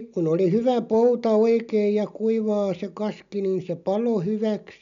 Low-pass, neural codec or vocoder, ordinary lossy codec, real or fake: 7.2 kHz; none; none; real